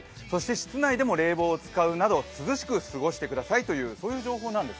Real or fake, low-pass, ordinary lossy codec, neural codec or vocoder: real; none; none; none